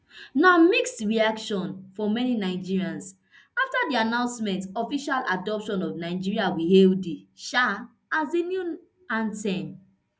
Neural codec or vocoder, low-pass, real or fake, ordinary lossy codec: none; none; real; none